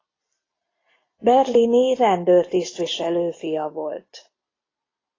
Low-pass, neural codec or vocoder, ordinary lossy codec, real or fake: 7.2 kHz; none; AAC, 32 kbps; real